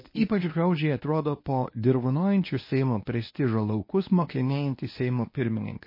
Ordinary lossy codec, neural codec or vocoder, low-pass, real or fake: MP3, 24 kbps; codec, 24 kHz, 0.9 kbps, WavTokenizer, medium speech release version 1; 5.4 kHz; fake